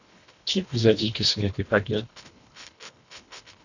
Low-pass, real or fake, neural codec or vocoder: 7.2 kHz; fake; codec, 24 kHz, 1.5 kbps, HILCodec